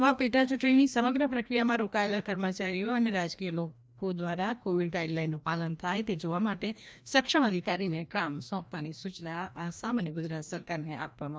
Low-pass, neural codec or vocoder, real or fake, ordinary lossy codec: none; codec, 16 kHz, 1 kbps, FreqCodec, larger model; fake; none